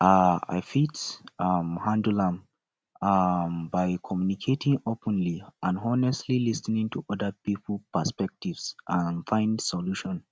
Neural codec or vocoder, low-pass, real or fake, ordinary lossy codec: none; none; real; none